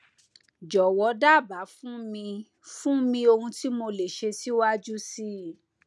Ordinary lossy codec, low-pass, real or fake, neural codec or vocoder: none; none; real; none